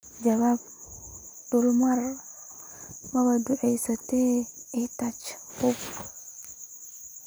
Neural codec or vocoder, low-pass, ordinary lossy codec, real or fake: none; none; none; real